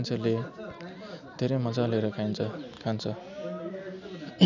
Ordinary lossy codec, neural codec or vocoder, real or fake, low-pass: none; none; real; 7.2 kHz